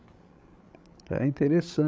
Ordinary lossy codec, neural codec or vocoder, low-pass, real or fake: none; codec, 16 kHz, 16 kbps, FreqCodec, larger model; none; fake